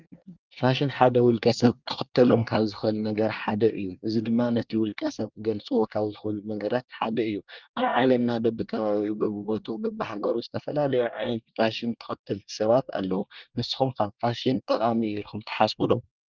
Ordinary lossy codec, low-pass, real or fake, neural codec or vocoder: Opus, 24 kbps; 7.2 kHz; fake; codec, 24 kHz, 1 kbps, SNAC